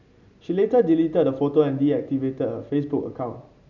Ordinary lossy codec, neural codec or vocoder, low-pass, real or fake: none; none; 7.2 kHz; real